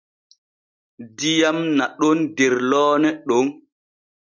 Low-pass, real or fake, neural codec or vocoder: 7.2 kHz; real; none